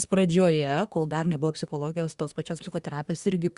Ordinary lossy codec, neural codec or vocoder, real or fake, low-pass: Opus, 64 kbps; codec, 24 kHz, 1 kbps, SNAC; fake; 10.8 kHz